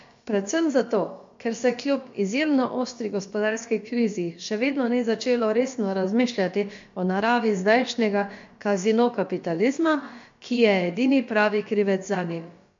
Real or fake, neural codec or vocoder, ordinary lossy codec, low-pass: fake; codec, 16 kHz, about 1 kbps, DyCAST, with the encoder's durations; MP3, 48 kbps; 7.2 kHz